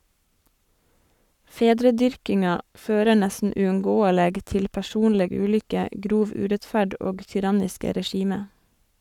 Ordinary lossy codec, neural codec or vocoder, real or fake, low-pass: none; codec, 44.1 kHz, 7.8 kbps, Pupu-Codec; fake; 19.8 kHz